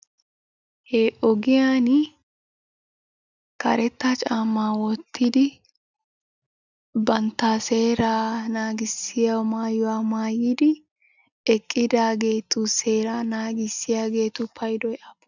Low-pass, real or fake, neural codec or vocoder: 7.2 kHz; real; none